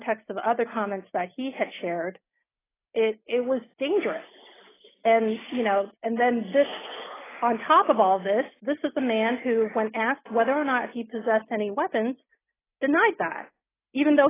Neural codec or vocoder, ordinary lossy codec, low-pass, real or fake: none; AAC, 16 kbps; 3.6 kHz; real